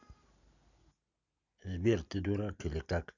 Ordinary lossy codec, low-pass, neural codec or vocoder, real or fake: none; 7.2 kHz; none; real